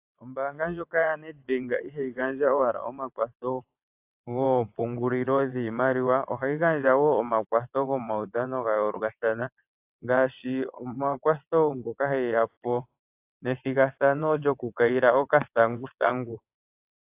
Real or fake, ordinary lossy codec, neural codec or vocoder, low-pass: fake; AAC, 32 kbps; vocoder, 22.05 kHz, 80 mel bands, WaveNeXt; 3.6 kHz